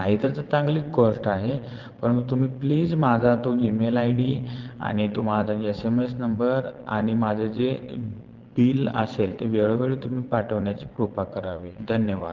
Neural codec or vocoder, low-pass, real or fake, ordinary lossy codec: vocoder, 22.05 kHz, 80 mel bands, WaveNeXt; 7.2 kHz; fake; Opus, 16 kbps